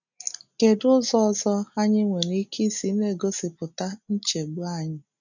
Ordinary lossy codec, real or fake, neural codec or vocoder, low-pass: none; real; none; 7.2 kHz